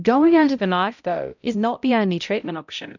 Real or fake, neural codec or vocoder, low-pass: fake; codec, 16 kHz, 0.5 kbps, X-Codec, HuBERT features, trained on balanced general audio; 7.2 kHz